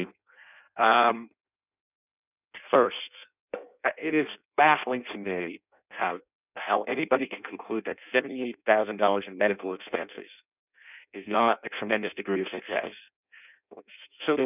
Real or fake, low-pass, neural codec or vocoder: fake; 3.6 kHz; codec, 16 kHz in and 24 kHz out, 0.6 kbps, FireRedTTS-2 codec